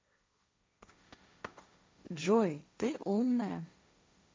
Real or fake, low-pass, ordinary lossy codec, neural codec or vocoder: fake; none; none; codec, 16 kHz, 1.1 kbps, Voila-Tokenizer